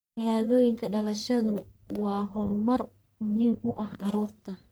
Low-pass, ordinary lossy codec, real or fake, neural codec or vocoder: none; none; fake; codec, 44.1 kHz, 1.7 kbps, Pupu-Codec